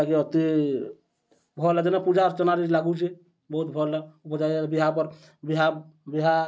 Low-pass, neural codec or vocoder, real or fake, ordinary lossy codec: none; none; real; none